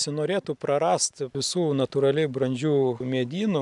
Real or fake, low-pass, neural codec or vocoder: real; 10.8 kHz; none